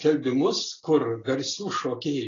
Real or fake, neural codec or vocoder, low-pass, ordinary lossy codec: real; none; 7.2 kHz; AAC, 32 kbps